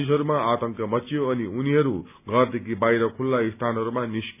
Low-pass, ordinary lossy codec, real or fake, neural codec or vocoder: 3.6 kHz; none; real; none